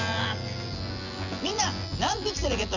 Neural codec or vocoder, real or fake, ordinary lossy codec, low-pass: vocoder, 24 kHz, 100 mel bands, Vocos; fake; none; 7.2 kHz